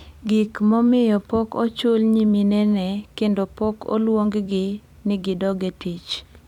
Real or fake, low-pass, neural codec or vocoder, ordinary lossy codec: fake; 19.8 kHz; vocoder, 44.1 kHz, 128 mel bands every 256 samples, BigVGAN v2; none